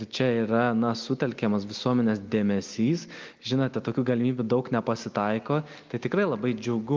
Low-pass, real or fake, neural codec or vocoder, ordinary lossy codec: 7.2 kHz; real; none; Opus, 24 kbps